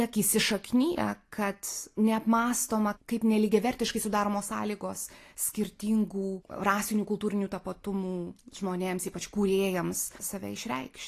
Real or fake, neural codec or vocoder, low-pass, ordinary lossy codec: real; none; 14.4 kHz; AAC, 48 kbps